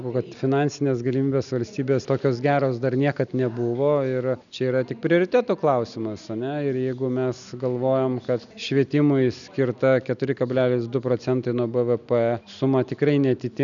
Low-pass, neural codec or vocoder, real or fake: 7.2 kHz; none; real